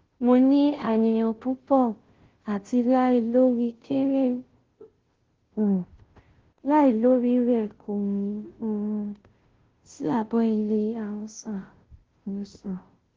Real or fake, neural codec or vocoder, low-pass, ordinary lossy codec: fake; codec, 16 kHz, 0.5 kbps, FunCodec, trained on Chinese and English, 25 frames a second; 7.2 kHz; Opus, 16 kbps